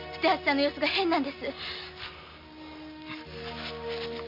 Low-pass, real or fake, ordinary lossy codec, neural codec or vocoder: 5.4 kHz; real; MP3, 48 kbps; none